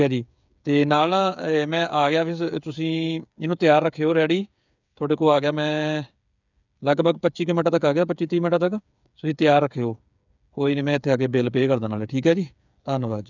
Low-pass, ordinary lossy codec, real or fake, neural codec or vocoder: 7.2 kHz; none; fake; codec, 16 kHz, 8 kbps, FreqCodec, smaller model